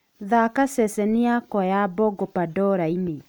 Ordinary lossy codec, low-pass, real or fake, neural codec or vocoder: none; none; real; none